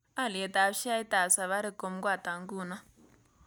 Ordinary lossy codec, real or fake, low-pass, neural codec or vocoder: none; real; none; none